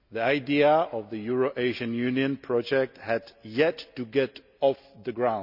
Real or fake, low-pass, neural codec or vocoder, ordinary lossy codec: real; 5.4 kHz; none; none